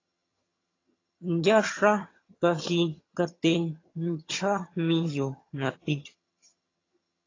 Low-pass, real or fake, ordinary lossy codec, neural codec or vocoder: 7.2 kHz; fake; AAC, 32 kbps; vocoder, 22.05 kHz, 80 mel bands, HiFi-GAN